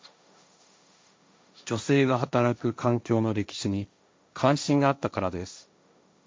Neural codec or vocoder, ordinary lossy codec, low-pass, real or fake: codec, 16 kHz, 1.1 kbps, Voila-Tokenizer; none; none; fake